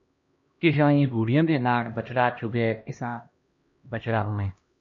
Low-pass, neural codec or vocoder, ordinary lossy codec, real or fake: 7.2 kHz; codec, 16 kHz, 1 kbps, X-Codec, HuBERT features, trained on LibriSpeech; MP3, 48 kbps; fake